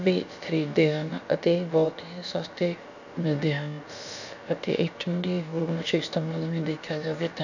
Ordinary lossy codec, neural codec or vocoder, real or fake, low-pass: none; codec, 16 kHz, about 1 kbps, DyCAST, with the encoder's durations; fake; 7.2 kHz